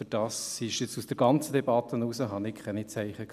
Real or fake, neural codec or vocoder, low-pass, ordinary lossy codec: real; none; 14.4 kHz; none